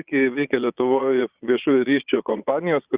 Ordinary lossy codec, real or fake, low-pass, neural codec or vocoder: Opus, 64 kbps; fake; 3.6 kHz; vocoder, 22.05 kHz, 80 mel bands, Vocos